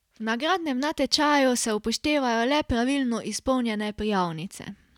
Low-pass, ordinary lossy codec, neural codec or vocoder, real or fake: 19.8 kHz; none; none; real